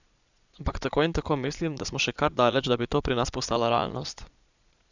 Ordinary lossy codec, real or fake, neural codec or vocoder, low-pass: none; fake; vocoder, 22.05 kHz, 80 mel bands, Vocos; 7.2 kHz